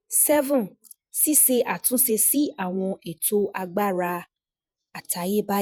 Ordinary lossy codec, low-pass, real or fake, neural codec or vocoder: none; none; fake; vocoder, 48 kHz, 128 mel bands, Vocos